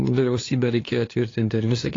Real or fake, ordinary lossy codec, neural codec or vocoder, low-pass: fake; AAC, 32 kbps; codec, 16 kHz, 2 kbps, FunCodec, trained on LibriTTS, 25 frames a second; 7.2 kHz